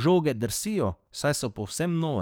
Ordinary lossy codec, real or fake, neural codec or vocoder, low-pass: none; fake; codec, 44.1 kHz, 7.8 kbps, DAC; none